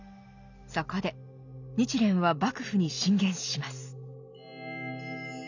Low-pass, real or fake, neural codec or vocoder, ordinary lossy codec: 7.2 kHz; real; none; none